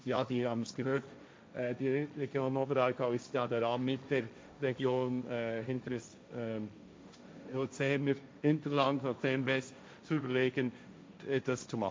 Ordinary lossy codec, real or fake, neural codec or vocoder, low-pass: none; fake; codec, 16 kHz, 1.1 kbps, Voila-Tokenizer; none